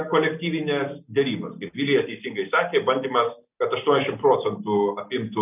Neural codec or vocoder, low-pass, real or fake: none; 3.6 kHz; real